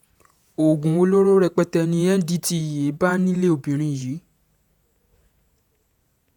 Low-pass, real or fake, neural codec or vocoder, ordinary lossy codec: 19.8 kHz; fake; vocoder, 48 kHz, 128 mel bands, Vocos; none